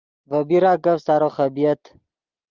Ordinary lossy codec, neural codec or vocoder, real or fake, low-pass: Opus, 32 kbps; none; real; 7.2 kHz